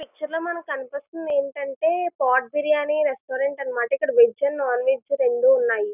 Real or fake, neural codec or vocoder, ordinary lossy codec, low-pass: real; none; none; 3.6 kHz